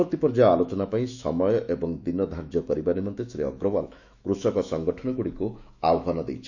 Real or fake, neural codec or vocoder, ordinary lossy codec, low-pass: fake; autoencoder, 48 kHz, 128 numbers a frame, DAC-VAE, trained on Japanese speech; none; 7.2 kHz